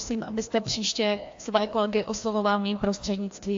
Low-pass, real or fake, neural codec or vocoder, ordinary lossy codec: 7.2 kHz; fake; codec, 16 kHz, 1 kbps, FreqCodec, larger model; AAC, 48 kbps